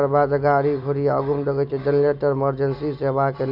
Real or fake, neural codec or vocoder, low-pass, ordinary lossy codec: real; none; 5.4 kHz; none